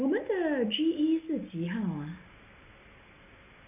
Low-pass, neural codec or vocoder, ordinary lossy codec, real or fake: 3.6 kHz; none; none; real